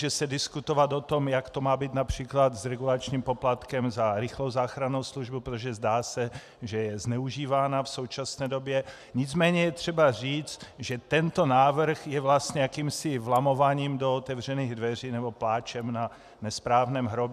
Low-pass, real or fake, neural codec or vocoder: 14.4 kHz; real; none